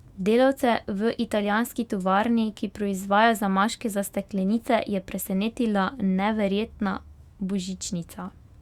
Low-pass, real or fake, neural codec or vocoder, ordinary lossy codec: 19.8 kHz; fake; autoencoder, 48 kHz, 128 numbers a frame, DAC-VAE, trained on Japanese speech; Opus, 64 kbps